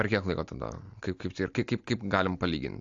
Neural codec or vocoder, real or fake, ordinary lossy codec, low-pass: none; real; AAC, 64 kbps; 7.2 kHz